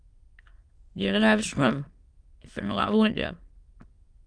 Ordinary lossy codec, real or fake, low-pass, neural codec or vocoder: Opus, 32 kbps; fake; 9.9 kHz; autoencoder, 22.05 kHz, a latent of 192 numbers a frame, VITS, trained on many speakers